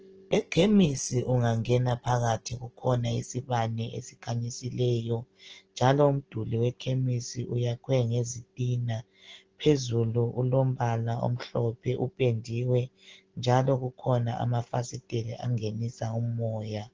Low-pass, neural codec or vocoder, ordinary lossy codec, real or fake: 7.2 kHz; none; Opus, 16 kbps; real